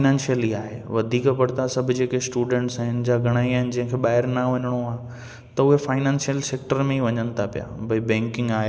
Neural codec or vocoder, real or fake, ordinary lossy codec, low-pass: none; real; none; none